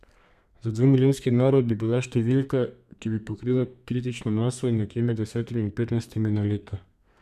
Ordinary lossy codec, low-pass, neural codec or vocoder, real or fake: none; 14.4 kHz; codec, 32 kHz, 1.9 kbps, SNAC; fake